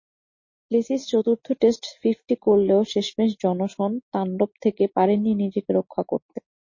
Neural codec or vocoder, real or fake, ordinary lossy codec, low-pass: none; real; MP3, 32 kbps; 7.2 kHz